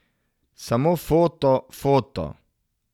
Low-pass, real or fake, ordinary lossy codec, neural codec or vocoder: 19.8 kHz; real; none; none